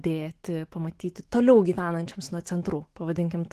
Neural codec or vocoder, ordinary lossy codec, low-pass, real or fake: autoencoder, 48 kHz, 128 numbers a frame, DAC-VAE, trained on Japanese speech; Opus, 16 kbps; 14.4 kHz; fake